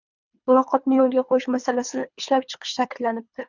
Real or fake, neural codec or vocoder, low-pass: fake; codec, 24 kHz, 3 kbps, HILCodec; 7.2 kHz